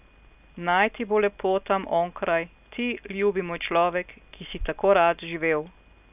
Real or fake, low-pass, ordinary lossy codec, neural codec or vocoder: real; 3.6 kHz; none; none